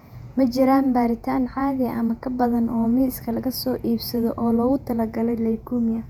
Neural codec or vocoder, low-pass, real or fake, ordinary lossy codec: vocoder, 48 kHz, 128 mel bands, Vocos; 19.8 kHz; fake; none